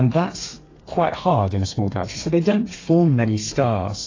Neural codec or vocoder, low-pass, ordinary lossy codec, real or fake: codec, 32 kHz, 1.9 kbps, SNAC; 7.2 kHz; AAC, 32 kbps; fake